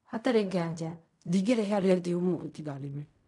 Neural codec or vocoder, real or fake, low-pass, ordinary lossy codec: codec, 16 kHz in and 24 kHz out, 0.4 kbps, LongCat-Audio-Codec, fine tuned four codebook decoder; fake; 10.8 kHz; none